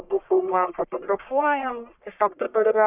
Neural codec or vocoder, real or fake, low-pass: codec, 44.1 kHz, 1.7 kbps, Pupu-Codec; fake; 3.6 kHz